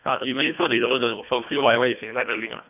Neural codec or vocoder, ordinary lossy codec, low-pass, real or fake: codec, 24 kHz, 1.5 kbps, HILCodec; none; 3.6 kHz; fake